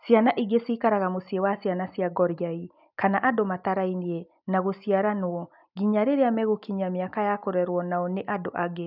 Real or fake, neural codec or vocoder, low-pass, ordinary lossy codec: real; none; 5.4 kHz; none